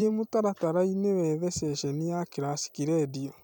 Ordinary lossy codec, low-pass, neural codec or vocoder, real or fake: none; none; vocoder, 44.1 kHz, 128 mel bands every 256 samples, BigVGAN v2; fake